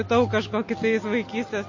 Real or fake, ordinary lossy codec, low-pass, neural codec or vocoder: real; MP3, 32 kbps; 7.2 kHz; none